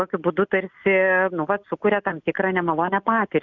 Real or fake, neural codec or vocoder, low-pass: real; none; 7.2 kHz